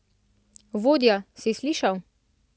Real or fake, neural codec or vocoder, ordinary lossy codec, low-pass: real; none; none; none